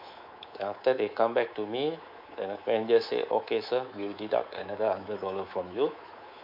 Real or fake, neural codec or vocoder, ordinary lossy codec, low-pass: fake; codec, 24 kHz, 3.1 kbps, DualCodec; MP3, 48 kbps; 5.4 kHz